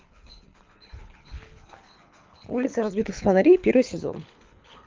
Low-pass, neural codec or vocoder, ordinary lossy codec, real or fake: 7.2 kHz; codec, 24 kHz, 6 kbps, HILCodec; Opus, 32 kbps; fake